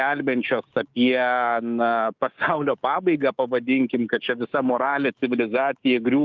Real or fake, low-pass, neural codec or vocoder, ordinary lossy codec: fake; 7.2 kHz; codec, 16 kHz, 6 kbps, DAC; Opus, 24 kbps